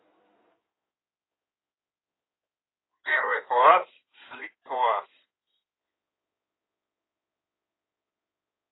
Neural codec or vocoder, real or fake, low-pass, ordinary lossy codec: codec, 16 kHz in and 24 kHz out, 2.2 kbps, FireRedTTS-2 codec; fake; 7.2 kHz; AAC, 16 kbps